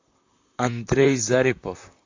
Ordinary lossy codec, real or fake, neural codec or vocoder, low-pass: AAC, 48 kbps; fake; vocoder, 44.1 kHz, 128 mel bands, Pupu-Vocoder; 7.2 kHz